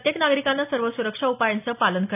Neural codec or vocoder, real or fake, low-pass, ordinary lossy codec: none; real; 3.6 kHz; none